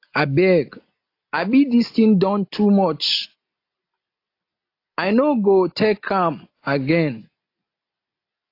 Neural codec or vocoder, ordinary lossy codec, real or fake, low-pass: none; AAC, 32 kbps; real; 5.4 kHz